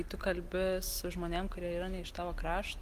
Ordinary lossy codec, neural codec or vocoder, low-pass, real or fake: Opus, 24 kbps; none; 14.4 kHz; real